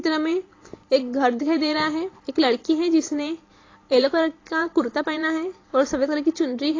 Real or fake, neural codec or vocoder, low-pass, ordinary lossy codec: fake; vocoder, 44.1 kHz, 128 mel bands every 512 samples, BigVGAN v2; 7.2 kHz; AAC, 32 kbps